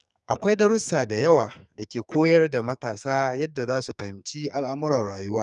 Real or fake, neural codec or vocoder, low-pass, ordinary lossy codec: fake; codec, 44.1 kHz, 2.6 kbps, SNAC; 10.8 kHz; none